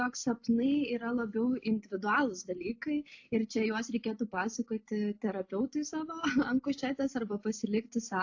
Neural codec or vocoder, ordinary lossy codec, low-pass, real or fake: none; AAC, 48 kbps; 7.2 kHz; real